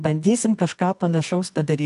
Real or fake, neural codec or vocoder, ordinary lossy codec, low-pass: fake; codec, 24 kHz, 0.9 kbps, WavTokenizer, medium music audio release; AAC, 96 kbps; 10.8 kHz